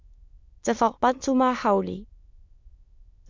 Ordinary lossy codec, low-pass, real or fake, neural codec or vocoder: MP3, 64 kbps; 7.2 kHz; fake; autoencoder, 22.05 kHz, a latent of 192 numbers a frame, VITS, trained on many speakers